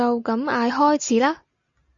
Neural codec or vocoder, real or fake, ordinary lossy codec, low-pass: none; real; AAC, 64 kbps; 7.2 kHz